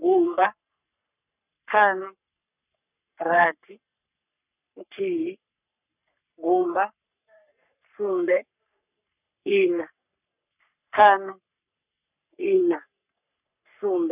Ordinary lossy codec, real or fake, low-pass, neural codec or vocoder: none; fake; 3.6 kHz; codec, 44.1 kHz, 3.4 kbps, Pupu-Codec